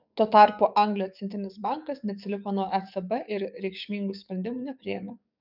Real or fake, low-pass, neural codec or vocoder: fake; 5.4 kHz; codec, 44.1 kHz, 7.8 kbps, DAC